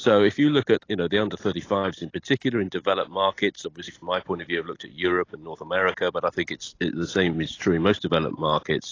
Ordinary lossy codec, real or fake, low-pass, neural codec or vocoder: AAC, 32 kbps; real; 7.2 kHz; none